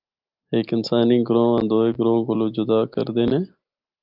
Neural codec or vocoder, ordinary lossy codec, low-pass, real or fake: none; Opus, 24 kbps; 5.4 kHz; real